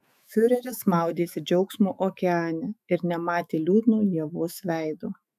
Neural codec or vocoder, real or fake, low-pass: autoencoder, 48 kHz, 128 numbers a frame, DAC-VAE, trained on Japanese speech; fake; 14.4 kHz